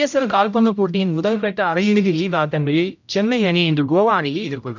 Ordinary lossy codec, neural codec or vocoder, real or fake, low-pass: none; codec, 16 kHz, 0.5 kbps, X-Codec, HuBERT features, trained on general audio; fake; 7.2 kHz